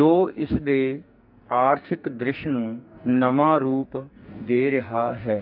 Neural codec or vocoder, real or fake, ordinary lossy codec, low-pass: codec, 44.1 kHz, 2.6 kbps, SNAC; fake; AAC, 48 kbps; 5.4 kHz